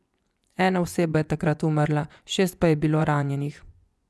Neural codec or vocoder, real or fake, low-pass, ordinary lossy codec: vocoder, 24 kHz, 100 mel bands, Vocos; fake; none; none